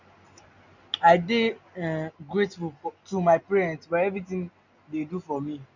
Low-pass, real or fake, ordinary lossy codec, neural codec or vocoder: 7.2 kHz; real; none; none